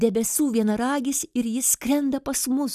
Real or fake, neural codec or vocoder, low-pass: real; none; 14.4 kHz